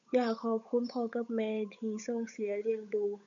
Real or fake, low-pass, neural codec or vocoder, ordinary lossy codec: fake; 7.2 kHz; codec, 16 kHz, 8 kbps, FunCodec, trained on Chinese and English, 25 frames a second; none